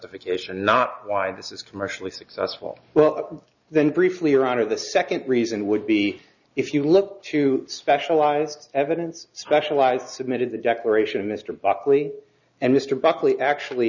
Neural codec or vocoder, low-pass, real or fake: none; 7.2 kHz; real